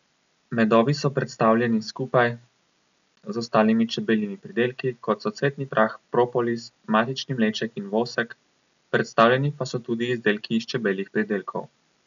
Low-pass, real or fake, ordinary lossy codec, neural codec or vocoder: 7.2 kHz; real; none; none